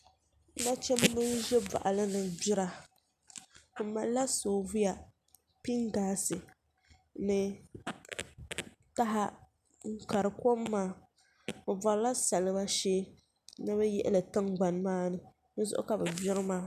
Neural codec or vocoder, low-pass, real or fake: none; 14.4 kHz; real